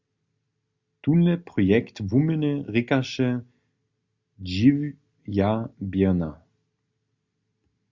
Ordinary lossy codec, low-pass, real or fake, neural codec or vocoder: Opus, 64 kbps; 7.2 kHz; real; none